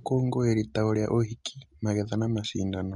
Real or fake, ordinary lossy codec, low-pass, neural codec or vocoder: real; MP3, 48 kbps; 19.8 kHz; none